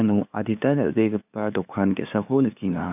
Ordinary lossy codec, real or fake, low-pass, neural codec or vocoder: none; fake; 3.6 kHz; codec, 16 kHz, 2 kbps, FunCodec, trained on LibriTTS, 25 frames a second